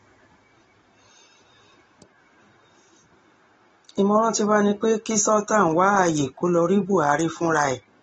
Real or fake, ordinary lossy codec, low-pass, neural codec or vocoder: real; AAC, 24 kbps; 19.8 kHz; none